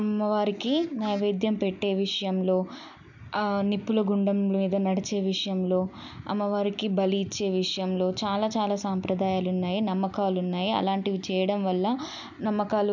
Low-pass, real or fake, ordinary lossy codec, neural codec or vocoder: 7.2 kHz; real; none; none